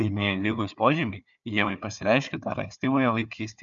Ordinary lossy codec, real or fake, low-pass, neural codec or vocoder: MP3, 96 kbps; fake; 7.2 kHz; codec, 16 kHz, 4 kbps, FunCodec, trained on Chinese and English, 50 frames a second